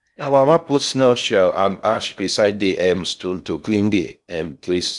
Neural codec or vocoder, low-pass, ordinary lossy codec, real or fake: codec, 16 kHz in and 24 kHz out, 0.6 kbps, FocalCodec, streaming, 4096 codes; 10.8 kHz; none; fake